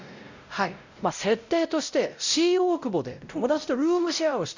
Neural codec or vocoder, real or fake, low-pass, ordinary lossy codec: codec, 16 kHz, 0.5 kbps, X-Codec, WavLM features, trained on Multilingual LibriSpeech; fake; 7.2 kHz; Opus, 64 kbps